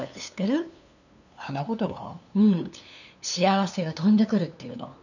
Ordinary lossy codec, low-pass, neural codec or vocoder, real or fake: none; 7.2 kHz; codec, 16 kHz, 2 kbps, FunCodec, trained on LibriTTS, 25 frames a second; fake